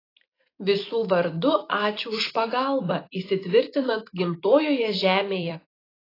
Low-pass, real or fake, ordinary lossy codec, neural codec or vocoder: 5.4 kHz; fake; AAC, 24 kbps; vocoder, 44.1 kHz, 128 mel bands every 256 samples, BigVGAN v2